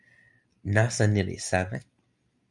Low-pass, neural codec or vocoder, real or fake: 10.8 kHz; none; real